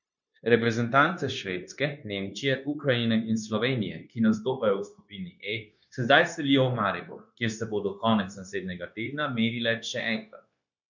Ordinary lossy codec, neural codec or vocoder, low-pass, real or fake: none; codec, 16 kHz, 0.9 kbps, LongCat-Audio-Codec; 7.2 kHz; fake